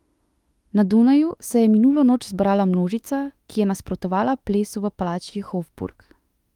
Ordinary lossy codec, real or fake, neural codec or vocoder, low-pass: Opus, 24 kbps; fake; autoencoder, 48 kHz, 32 numbers a frame, DAC-VAE, trained on Japanese speech; 19.8 kHz